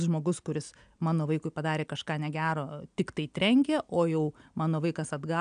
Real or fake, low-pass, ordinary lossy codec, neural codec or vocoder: real; 9.9 kHz; AAC, 96 kbps; none